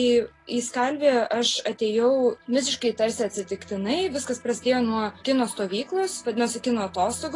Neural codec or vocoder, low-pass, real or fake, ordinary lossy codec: none; 10.8 kHz; real; AAC, 32 kbps